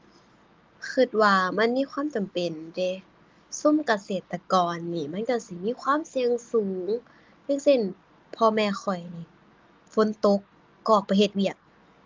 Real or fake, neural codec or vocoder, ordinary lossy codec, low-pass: real; none; Opus, 32 kbps; 7.2 kHz